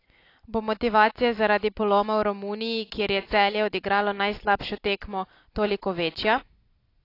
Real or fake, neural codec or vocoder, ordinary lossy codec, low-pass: real; none; AAC, 32 kbps; 5.4 kHz